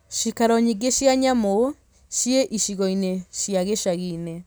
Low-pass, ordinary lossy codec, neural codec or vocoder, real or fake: none; none; none; real